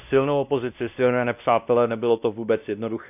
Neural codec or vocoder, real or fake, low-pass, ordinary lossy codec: codec, 16 kHz, 1 kbps, X-Codec, WavLM features, trained on Multilingual LibriSpeech; fake; 3.6 kHz; none